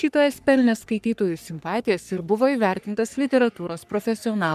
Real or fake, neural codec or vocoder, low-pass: fake; codec, 44.1 kHz, 3.4 kbps, Pupu-Codec; 14.4 kHz